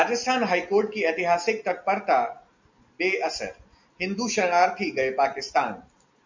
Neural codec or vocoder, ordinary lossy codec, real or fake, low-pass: none; MP3, 64 kbps; real; 7.2 kHz